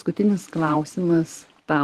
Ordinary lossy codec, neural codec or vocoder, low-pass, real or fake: Opus, 16 kbps; vocoder, 44.1 kHz, 128 mel bands every 512 samples, BigVGAN v2; 14.4 kHz; fake